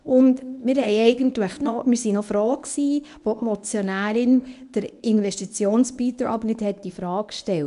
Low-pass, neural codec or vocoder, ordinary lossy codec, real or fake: 10.8 kHz; codec, 24 kHz, 0.9 kbps, WavTokenizer, medium speech release version 1; none; fake